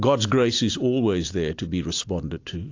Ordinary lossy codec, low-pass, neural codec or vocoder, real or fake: AAC, 48 kbps; 7.2 kHz; none; real